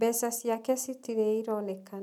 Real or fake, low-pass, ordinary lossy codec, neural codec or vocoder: real; 19.8 kHz; none; none